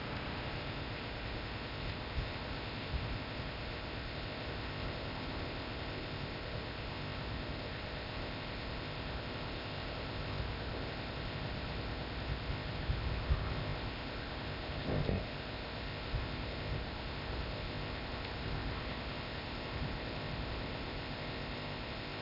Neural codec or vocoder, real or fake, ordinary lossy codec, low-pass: codec, 16 kHz, 0.8 kbps, ZipCodec; fake; AAC, 48 kbps; 5.4 kHz